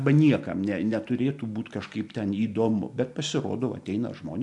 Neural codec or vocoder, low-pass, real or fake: none; 10.8 kHz; real